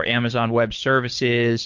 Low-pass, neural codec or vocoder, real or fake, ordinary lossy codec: 7.2 kHz; codec, 24 kHz, 6 kbps, HILCodec; fake; MP3, 48 kbps